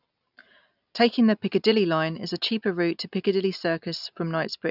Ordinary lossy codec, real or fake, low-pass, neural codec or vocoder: none; real; 5.4 kHz; none